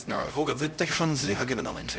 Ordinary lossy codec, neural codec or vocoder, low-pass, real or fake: none; codec, 16 kHz, 0.5 kbps, X-Codec, HuBERT features, trained on LibriSpeech; none; fake